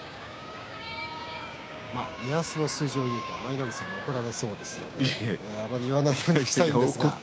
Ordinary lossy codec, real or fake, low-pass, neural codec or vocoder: none; fake; none; codec, 16 kHz, 6 kbps, DAC